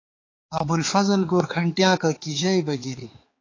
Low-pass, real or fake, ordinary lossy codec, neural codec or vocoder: 7.2 kHz; fake; AAC, 32 kbps; codec, 16 kHz, 4 kbps, X-Codec, HuBERT features, trained on balanced general audio